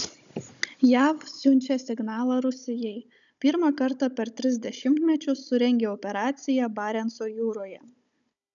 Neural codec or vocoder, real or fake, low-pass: codec, 16 kHz, 16 kbps, FunCodec, trained on Chinese and English, 50 frames a second; fake; 7.2 kHz